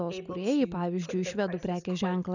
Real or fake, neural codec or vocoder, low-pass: real; none; 7.2 kHz